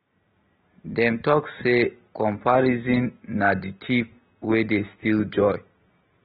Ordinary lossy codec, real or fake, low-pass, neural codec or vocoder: AAC, 16 kbps; real; 10.8 kHz; none